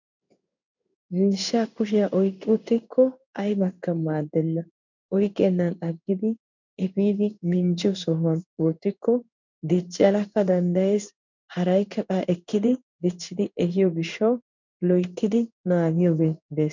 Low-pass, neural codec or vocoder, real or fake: 7.2 kHz; codec, 16 kHz in and 24 kHz out, 1 kbps, XY-Tokenizer; fake